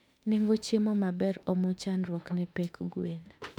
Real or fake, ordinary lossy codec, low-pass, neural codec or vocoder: fake; none; 19.8 kHz; autoencoder, 48 kHz, 32 numbers a frame, DAC-VAE, trained on Japanese speech